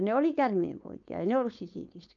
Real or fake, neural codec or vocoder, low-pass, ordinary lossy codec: fake; codec, 16 kHz, 4.8 kbps, FACodec; 7.2 kHz; AAC, 64 kbps